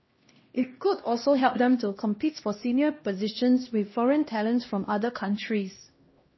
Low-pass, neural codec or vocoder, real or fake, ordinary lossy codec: 7.2 kHz; codec, 16 kHz, 1 kbps, X-Codec, HuBERT features, trained on LibriSpeech; fake; MP3, 24 kbps